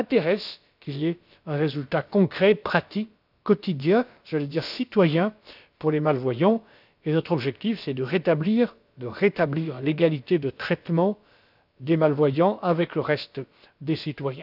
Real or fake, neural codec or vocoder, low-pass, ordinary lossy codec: fake; codec, 16 kHz, about 1 kbps, DyCAST, with the encoder's durations; 5.4 kHz; none